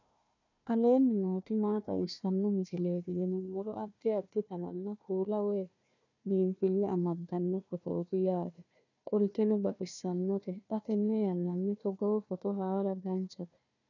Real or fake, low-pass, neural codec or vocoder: fake; 7.2 kHz; codec, 16 kHz, 1 kbps, FunCodec, trained on Chinese and English, 50 frames a second